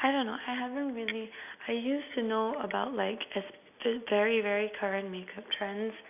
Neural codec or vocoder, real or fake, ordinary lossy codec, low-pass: none; real; none; 3.6 kHz